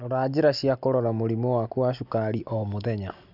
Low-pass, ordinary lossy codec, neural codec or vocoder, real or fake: 5.4 kHz; none; none; real